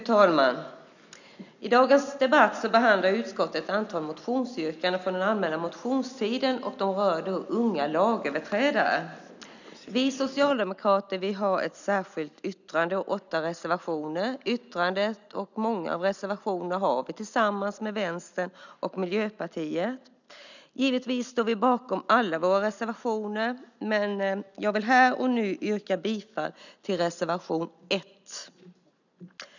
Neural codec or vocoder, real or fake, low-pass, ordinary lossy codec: none; real; 7.2 kHz; none